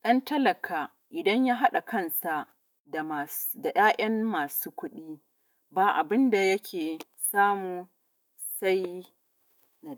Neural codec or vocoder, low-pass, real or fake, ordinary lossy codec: autoencoder, 48 kHz, 128 numbers a frame, DAC-VAE, trained on Japanese speech; none; fake; none